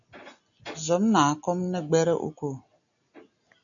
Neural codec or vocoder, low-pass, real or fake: none; 7.2 kHz; real